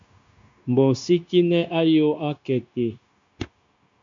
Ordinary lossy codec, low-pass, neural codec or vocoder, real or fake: MP3, 64 kbps; 7.2 kHz; codec, 16 kHz, 0.9 kbps, LongCat-Audio-Codec; fake